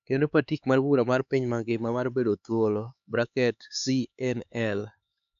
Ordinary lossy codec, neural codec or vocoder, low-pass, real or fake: AAC, 96 kbps; codec, 16 kHz, 4 kbps, X-Codec, HuBERT features, trained on LibriSpeech; 7.2 kHz; fake